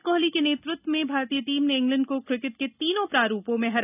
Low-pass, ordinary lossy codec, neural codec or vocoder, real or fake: 3.6 kHz; none; none; real